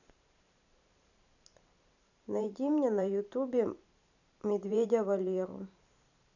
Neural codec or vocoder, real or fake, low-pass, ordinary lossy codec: vocoder, 44.1 kHz, 128 mel bands every 512 samples, BigVGAN v2; fake; 7.2 kHz; none